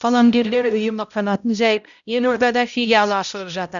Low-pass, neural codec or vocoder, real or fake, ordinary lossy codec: 7.2 kHz; codec, 16 kHz, 0.5 kbps, X-Codec, HuBERT features, trained on balanced general audio; fake; none